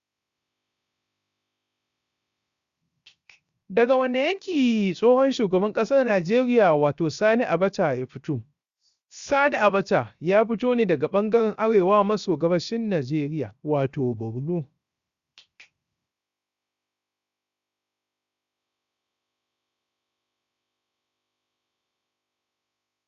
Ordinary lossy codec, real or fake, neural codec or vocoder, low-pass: Opus, 64 kbps; fake; codec, 16 kHz, 0.7 kbps, FocalCodec; 7.2 kHz